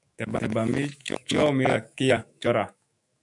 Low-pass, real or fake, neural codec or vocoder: 10.8 kHz; fake; autoencoder, 48 kHz, 128 numbers a frame, DAC-VAE, trained on Japanese speech